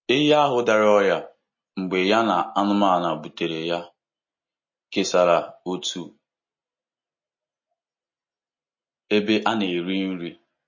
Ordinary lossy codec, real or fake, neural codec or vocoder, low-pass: MP3, 32 kbps; real; none; 7.2 kHz